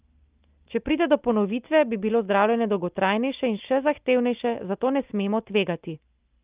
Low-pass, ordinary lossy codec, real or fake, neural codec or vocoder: 3.6 kHz; Opus, 24 kbps; real; none